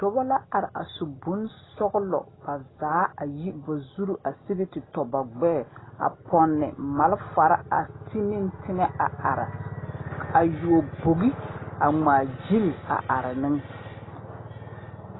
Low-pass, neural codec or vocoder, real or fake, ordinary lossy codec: 7.2 kHz; none; real; AAC, 16 kbps